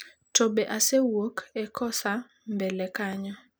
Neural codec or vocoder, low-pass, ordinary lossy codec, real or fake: none; none; none; real